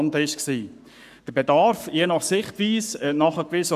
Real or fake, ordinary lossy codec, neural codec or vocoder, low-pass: fake; none; codec, 44.1 kHz, 7.8 kbps, Pupu-Codec; 14.4 kHz